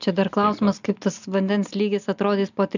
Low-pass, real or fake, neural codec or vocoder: 7.2 kHz; real; none